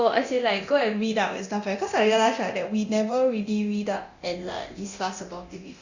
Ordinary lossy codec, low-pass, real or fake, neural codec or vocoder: Opus, 64 kbps; 7.2 kHz; fake; codec, 24 kHz, 0.9 kbps, DualCodec